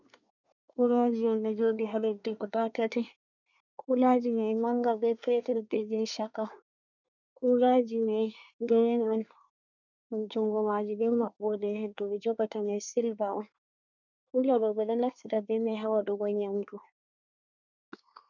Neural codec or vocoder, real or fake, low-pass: codec, 24 kHz, 1 kbps, SNAC; fake; 7.2 kHz